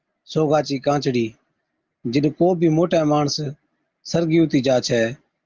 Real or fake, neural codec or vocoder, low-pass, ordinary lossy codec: real; none; 7.2 kHz; Opus, 32 kbps